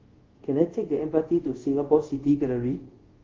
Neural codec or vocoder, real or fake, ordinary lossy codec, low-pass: codec, 24 kHz, 0.5 kbps, DualCodec; fake; Opus, 16 kbps; 7.2 kHz